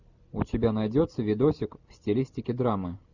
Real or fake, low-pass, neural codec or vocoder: real; 7.2 kHz; none